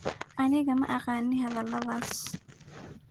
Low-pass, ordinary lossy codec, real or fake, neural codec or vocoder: 19.8 kHz; Opus, 16 kbps; real; none